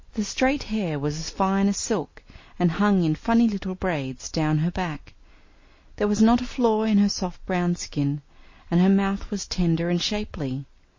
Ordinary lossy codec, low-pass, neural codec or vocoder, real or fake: MP3, 32 kbps; 7.2 kHz; none; real